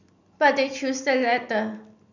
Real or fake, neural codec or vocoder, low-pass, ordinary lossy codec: real; none; 7.2 kHz; none